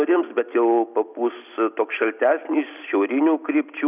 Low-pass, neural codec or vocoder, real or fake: 3.6 kHz; none; real